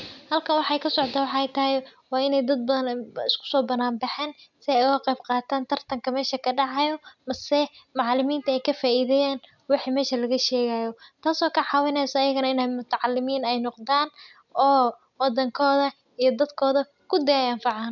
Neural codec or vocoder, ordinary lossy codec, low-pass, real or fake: none; none; 7.2 kHz; real